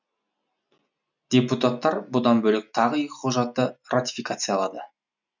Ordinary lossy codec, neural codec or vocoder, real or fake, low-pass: none; none; real; 7.2 kHz